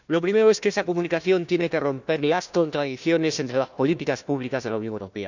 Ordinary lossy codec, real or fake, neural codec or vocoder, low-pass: none; fake; codec, 16 kHz, 1 kbps, FunCodec, trained on Chinese and English, 50 frames a second; 7.2 kHz